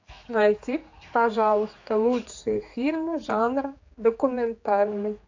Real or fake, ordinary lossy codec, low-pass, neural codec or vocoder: fake; AAC, 48 kbps; 7.2 kHz; codec, 16 kHz, 4 kbps, X-Codec, HuBERT features, trained on general audio